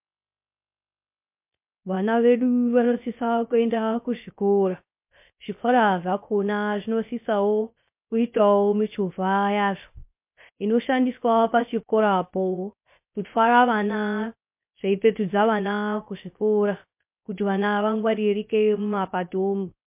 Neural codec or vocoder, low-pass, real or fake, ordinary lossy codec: codec, 16 kHz, 0.3 kbps, FocalCodec; 3.6 kHz; fake; MP3, 32 kbps